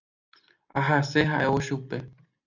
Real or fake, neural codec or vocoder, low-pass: real; none; 7.2 kHz